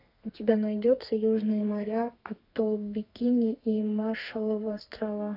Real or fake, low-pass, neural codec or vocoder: fake; 5.4 kHz; codec, 32 kHz, 1.9 kbps, SNAC